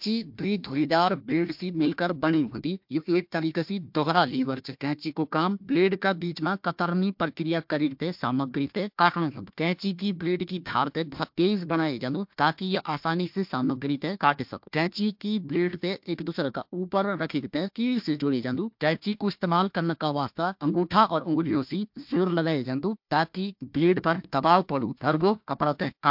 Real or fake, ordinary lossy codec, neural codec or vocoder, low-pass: fake; MP3, 48 kbps; codec, 16 kHz, 1 kbps, FunCodec, trained on Chinese and English, 50 frames a second; 5.4 kHz